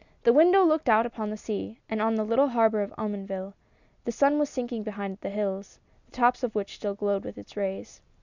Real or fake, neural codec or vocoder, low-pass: real; none; 7.2 kHz